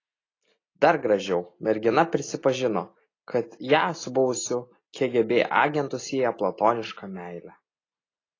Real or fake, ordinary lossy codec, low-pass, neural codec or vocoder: real; AAC, 32 kbps; 7.2 kHz; none